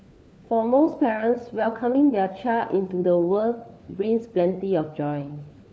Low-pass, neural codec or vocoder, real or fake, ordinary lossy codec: none; codec, 16 kHz, 4 kbps, FunCodec, trained on LibriTTS, 50 frames a second; fake; none